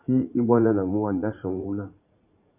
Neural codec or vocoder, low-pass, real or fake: vocoder, 44.1 kHz, 80 mel bands, Vocos; 3.6 kHz; fake